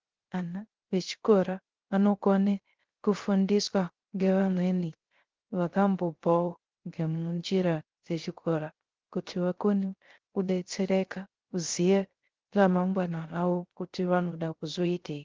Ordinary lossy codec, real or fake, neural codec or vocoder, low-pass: Opus, 16 kbps; fake; codec, 16 kHz, 0.3 kbps, FocalCodec; 7.2 kHz